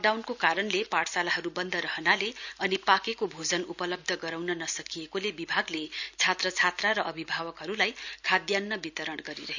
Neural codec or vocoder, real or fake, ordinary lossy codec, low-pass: none; real; none; 7.2 kHz